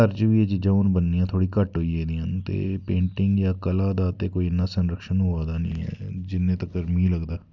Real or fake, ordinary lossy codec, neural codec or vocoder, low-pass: real; none; none; 7.2 kHz